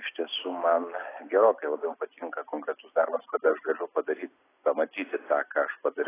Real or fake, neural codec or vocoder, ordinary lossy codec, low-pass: real; none; AAC, 16 kbps; 3.6 kHz